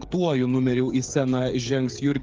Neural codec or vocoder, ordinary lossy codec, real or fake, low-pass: codec, 16 kHz, 8 kbps, FreqCodec, smaller model; Opus, 32 kbps; fake; 7.2 kHz